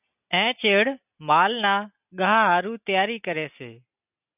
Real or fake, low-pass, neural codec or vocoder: real; 3.6 kHz; none